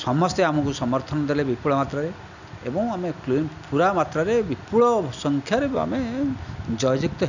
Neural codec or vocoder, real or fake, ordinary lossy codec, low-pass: none; real; none; 7.2 kHz